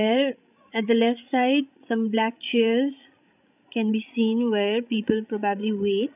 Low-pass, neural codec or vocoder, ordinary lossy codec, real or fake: 3.6 kHz; codec, 16 kHz, 16 kbps, FreqCodec, larger model; none; fake